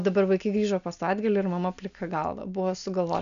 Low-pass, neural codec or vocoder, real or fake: 7.2 kHz; none; real